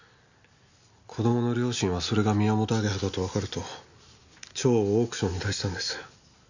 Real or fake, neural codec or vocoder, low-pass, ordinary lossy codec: real; none; 7.2 kHz; none